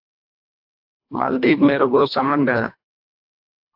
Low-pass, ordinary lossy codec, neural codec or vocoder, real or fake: 5.4 kHz; AAC, 48 kbps; codec, 24 kHz, 1.5 kbps, HILCodec; fake